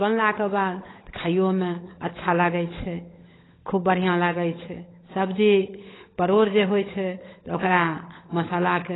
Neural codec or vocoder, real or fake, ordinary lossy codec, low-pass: codec, 16 kHz, 16 kbps, FunCodec, trained on LibriTTS, 50 frames a second; fake; AAC, 16 kbps; 7.2 kHz